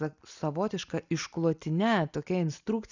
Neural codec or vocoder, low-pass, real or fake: none; 7.2 kHz; real